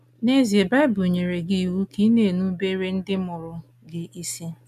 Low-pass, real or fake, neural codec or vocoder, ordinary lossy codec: 14.4 kHz; real; none; none